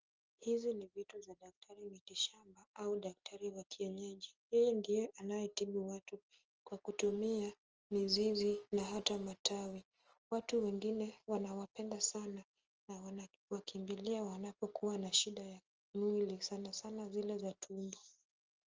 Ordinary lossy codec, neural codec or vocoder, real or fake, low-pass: Opus, 24 kbps; none; real; 7.2 kHz